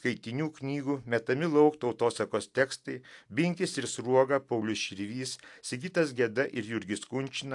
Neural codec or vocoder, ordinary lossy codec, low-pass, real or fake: none; AAC, 64 kbps; 10.8 kHz; real